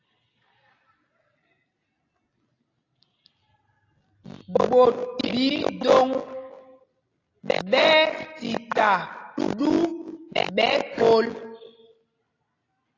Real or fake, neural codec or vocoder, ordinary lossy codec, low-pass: real; none; AAC, 32 kbps; 7.2 kHz